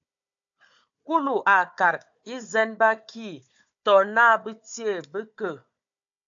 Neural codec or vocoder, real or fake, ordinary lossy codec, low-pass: codec, 16 kHz, 4 kbps, FunCodec, trained on Chinese and English, 50 frames a second; fake; AAC, 64 kbps; 7.2 kHz